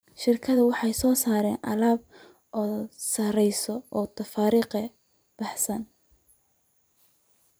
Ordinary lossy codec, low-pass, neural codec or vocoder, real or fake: none; none; vocoder, 44.1 kHz, 128 mel bands every 512 samples, BigVGAN v2; fake